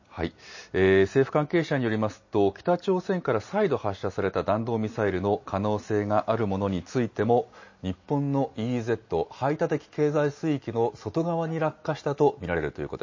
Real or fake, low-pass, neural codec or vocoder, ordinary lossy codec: real; 7.2 kHz; none; MP3, 32 kbps